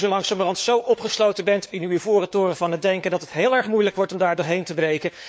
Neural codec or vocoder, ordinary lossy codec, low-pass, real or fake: codec, 16 kHz, 4 kbps, FunCodec, trained on LibriTTS, 50 frames a second; none; none; fake